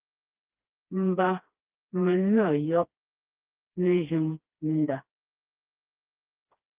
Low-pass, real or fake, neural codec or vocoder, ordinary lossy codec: 3.6 kHz; fake; codec, 16 kHz, 2 kbps, FreqCodec, smaller model; Opus, 32 kbps